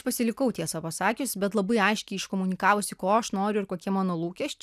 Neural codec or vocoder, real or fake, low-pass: none; real; 14.4 kHz